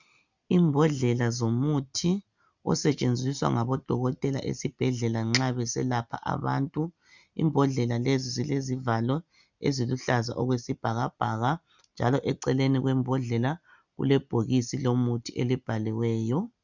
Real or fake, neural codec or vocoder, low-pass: real; none; 7.2 kHz